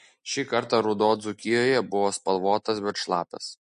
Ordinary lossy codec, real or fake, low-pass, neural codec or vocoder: MP3, 48 kbps; real; 14.4 kHz; none